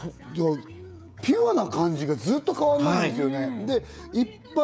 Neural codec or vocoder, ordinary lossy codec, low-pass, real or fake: codec, 16 kHz, 16 kbps, FreqCodec, smaller model; none; none; fake